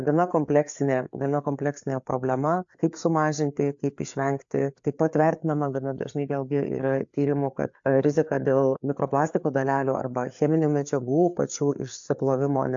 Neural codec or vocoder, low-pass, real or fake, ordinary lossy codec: codec, 16 kHz, 4 kbps, FreqCodec, larger model; 7.2 kHz; fake; AAC, 64 kbps